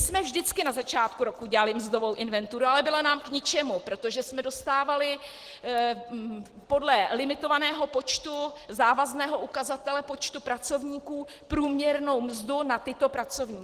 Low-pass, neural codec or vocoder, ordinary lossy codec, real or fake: 14.4 kHz; none; Opus, 16 kbps; real